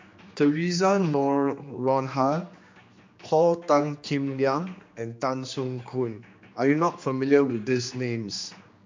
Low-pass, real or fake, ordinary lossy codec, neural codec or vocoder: 7.2 kHz; fake; MP3, 48 kbps; codec, 16 kHz, 2 kbps, X-Codec, HuBERT features, trained on general audio